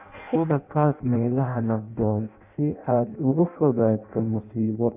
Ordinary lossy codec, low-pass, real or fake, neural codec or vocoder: none; 3.6 kHz; fake; codec, 16 kHz in and 24 kHz out, 0.6 kbps, FireRedTTS-2 codec